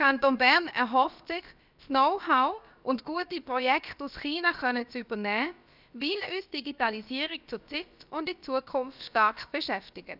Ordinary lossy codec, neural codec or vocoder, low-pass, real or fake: none; codec, 16 kHz, about 1 kbps, DyCAST, with the encoder's durations; 5.4 kHz; fake